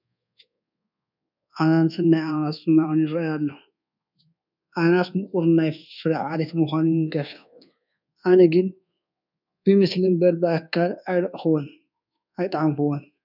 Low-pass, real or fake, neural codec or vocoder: 5.4 kHz; fake; codec, 24 kHz, 1.2 kbps, DualCodec